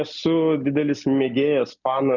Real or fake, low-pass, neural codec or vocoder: real; 7.2 kHz; none